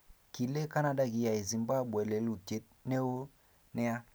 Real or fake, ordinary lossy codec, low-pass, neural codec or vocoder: real; none; none; none